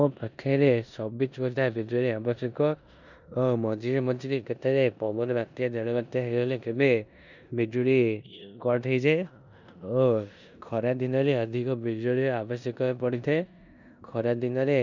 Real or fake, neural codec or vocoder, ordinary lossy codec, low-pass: fake; codec, 16 kHz in and 24 kHz out, 0.9 kbps, LongCat-Audio-Codec, four codebook decoder; none; 7.2 kHz